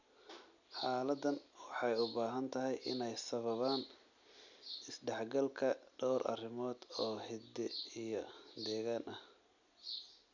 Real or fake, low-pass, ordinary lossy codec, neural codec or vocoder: real; 7.2 kHz; none; none